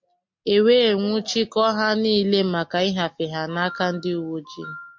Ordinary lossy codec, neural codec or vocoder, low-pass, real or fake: MP3, 48 kbps; none; 7.2 kHz; real